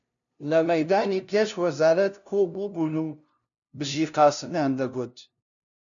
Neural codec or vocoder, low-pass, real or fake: codec, 16 kHz, 0.5 kbps, FunCodec, trained on LibriTTS, 25 frames a second; 7.2 kHz; fake